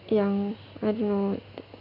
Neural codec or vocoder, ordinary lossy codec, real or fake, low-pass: none; none; real; 5.4 kHz